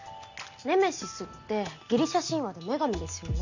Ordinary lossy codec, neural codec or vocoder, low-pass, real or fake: none; none; 7.2 kHz; real